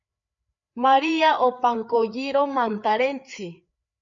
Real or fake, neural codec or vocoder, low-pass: fake; codec, 16 kHz, 4 kbps, FreqCodec, larger model; 7.2 kHz